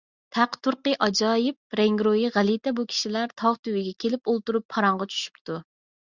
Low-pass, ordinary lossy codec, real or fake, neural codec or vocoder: 7.2 kHz; Opus, 64 kbps; real; none